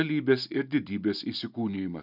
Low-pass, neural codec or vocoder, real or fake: 5.4 kHz; none; real